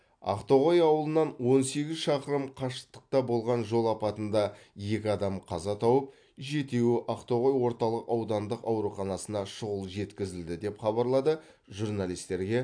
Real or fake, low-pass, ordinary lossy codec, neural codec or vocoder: real; 9.9 kHz; AAC, 64 kbps; none